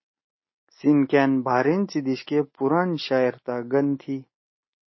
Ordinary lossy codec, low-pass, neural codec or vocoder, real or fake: MP3, 24 kbps; 7.2 kHz; none; real